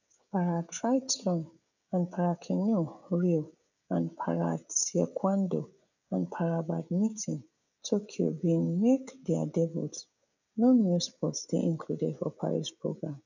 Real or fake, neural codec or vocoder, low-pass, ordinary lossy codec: fake; codec, 16 kHz, 16 kbps, FreqCodec, smaller model; 7.2 kHz; none